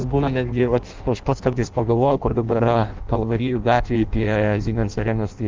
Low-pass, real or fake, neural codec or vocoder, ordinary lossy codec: 7.2 kHz; fake; codec, 16 kHz in and 24 kHz out, 0.6 kbps, FireRedTTS-2 codec; Opus, 32 kbps